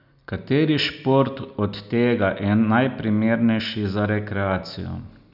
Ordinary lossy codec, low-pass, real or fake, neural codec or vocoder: none; 5.4 kHz; real; none